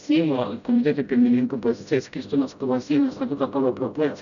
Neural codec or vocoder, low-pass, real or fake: codec, 16 kHz, 0.5 kbps, FreqCodec, smaller model; 7.2 kHz; fake